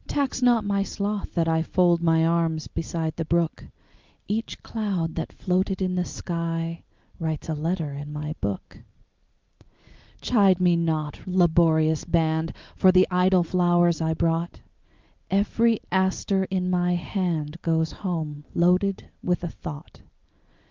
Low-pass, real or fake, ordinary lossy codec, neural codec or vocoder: 7.2 kHz; real; Opus, 32 kbps; none